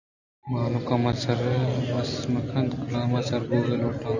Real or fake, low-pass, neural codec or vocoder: real; 7.2 kHz; none